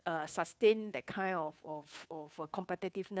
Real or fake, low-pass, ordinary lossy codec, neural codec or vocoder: fake; none; none; codec, 16 kHz, 2 kbps, FunCodec, trained on Chinese and English, 25 frames a second